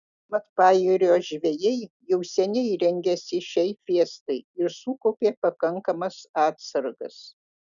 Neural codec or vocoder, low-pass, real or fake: none; 7.2 kHz; real